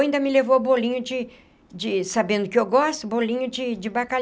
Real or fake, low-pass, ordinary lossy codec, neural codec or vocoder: real; none; none; none